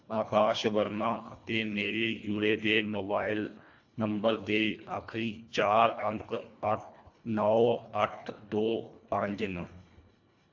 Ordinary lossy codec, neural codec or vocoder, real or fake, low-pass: AAC, 48 kbps; codec, 24 kHz, 1.5 kbps, HILCodec; fake; 7.2 kHz